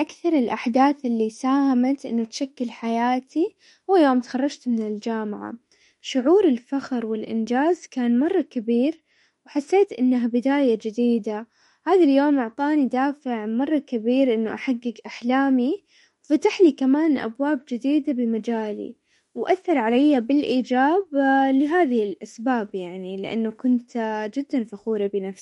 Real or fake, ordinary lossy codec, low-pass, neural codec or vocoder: fake; MP3, 48 kbps; 19.8 kHz; autoencoder, 48 kHz, 32 numbers a frame, DAC-VAE, trained on Japanese speech